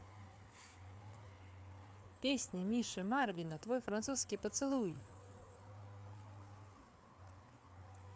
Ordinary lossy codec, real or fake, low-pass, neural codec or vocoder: none; fake; none; codec, 16 kHz, 4 kbps, FreqCodec, larger model